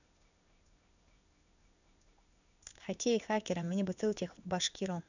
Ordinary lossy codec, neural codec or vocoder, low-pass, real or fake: none; codec, 16 kHz in and 24 kHz out, 1 kbps, XY-Tokenizer; 7.2 kHz; fake